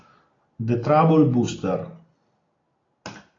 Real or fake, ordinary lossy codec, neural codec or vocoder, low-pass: real; AAC, 32 kbps; none; 7.2 kHz